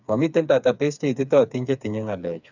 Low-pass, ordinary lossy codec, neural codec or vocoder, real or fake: 7.2 kHz; none; codec, 16 kHz, 4 kbps, FreqCodec, smaller model; fake